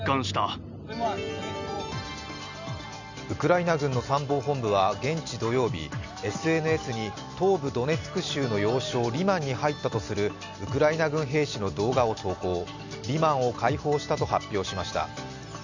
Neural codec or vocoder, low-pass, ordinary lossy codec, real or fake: none; 7.2 kHz; none; real